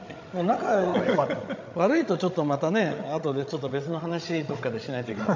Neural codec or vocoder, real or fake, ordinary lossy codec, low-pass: codec, 16 kHz, 16 kbps, FreqCodec, larger model; fake; MP3, 48 kbps; 7.2 kHz